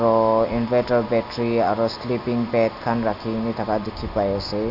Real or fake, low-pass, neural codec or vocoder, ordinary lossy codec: real; 5.4 kHz; none; none